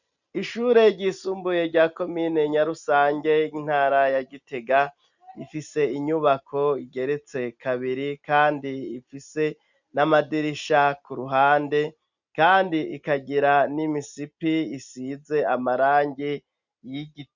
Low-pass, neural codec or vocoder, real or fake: 7.2 kHz; none; real